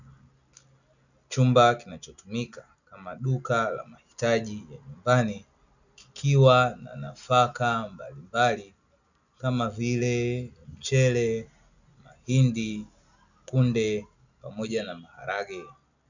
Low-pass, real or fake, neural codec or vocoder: 7.2 kHz; real; none